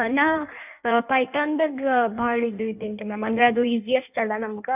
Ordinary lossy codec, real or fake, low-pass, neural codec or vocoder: none; fake; 3.6 kHz; codec, 16 kHz in and 24 kHz out, 1.1 kbps, FireRedTTS-2 codec